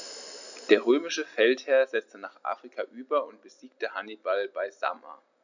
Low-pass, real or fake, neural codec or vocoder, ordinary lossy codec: 7.2 kHz; real; none; none